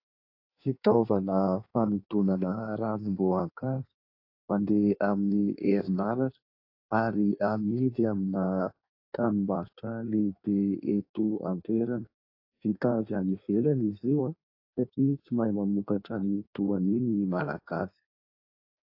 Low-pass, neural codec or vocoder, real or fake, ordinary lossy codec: 5.4 kHz; codec, 16 kHz in and 24 kHz out, 1.1 kbps, FireRedTTS-2 codec; fake; AAC, 32 kbps